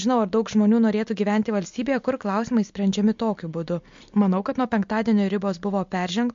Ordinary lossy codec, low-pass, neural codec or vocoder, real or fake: MP3, 48 kbps; 7.2 kHz; none; real